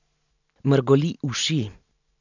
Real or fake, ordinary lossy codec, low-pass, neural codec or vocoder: real; none; 7.2 kHz; none